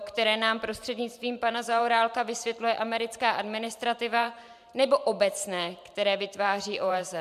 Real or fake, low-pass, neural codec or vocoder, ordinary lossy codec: fake; 14.4 kHz; vocoder, 44.1 kHz, 128 mel bands every 512 samples, BigVGAN v2; MP3, 96 kbps